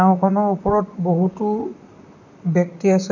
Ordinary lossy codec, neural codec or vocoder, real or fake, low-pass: none; vocoder, 44.1 kHz, 128 mel bands, Pupu-Vocoder; fake; 7.2 kHz